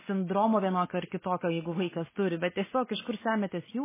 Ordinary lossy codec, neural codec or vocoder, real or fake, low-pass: MP3, 16 kbps; none; real; 3.6 kHz